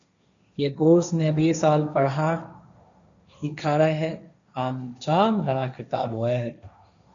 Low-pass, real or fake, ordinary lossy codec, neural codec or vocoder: 7.2 kHz; fake; MP3, 96 kbps; codec, 16 kHz, 1.1 kbps, Voila-Tokenizer